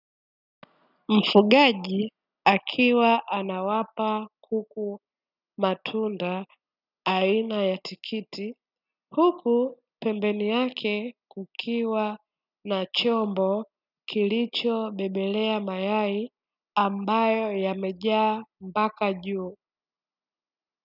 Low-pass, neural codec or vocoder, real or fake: 5.4 kHz; none; real